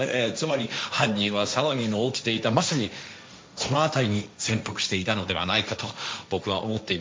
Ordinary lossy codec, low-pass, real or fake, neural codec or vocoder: none; none; fake; codec, 16 kHz, 1.1 kbps, Voila-Tokenizer